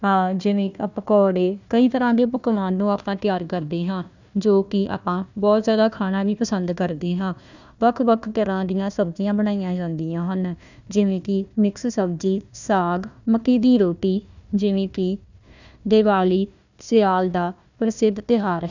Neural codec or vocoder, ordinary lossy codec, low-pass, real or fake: codec, 16 kHz, 1 kbps, FunCodec, trained on Chinese and English, 50 frames a second; none; 7.2 kHz; fake